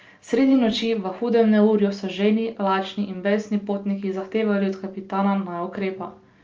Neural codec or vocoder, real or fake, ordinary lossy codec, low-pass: none; real; Opus, 24 kbps; 7.2 kHz